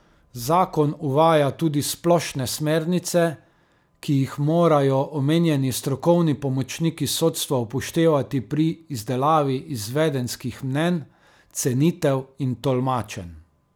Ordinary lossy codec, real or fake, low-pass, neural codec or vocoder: none; real; none; none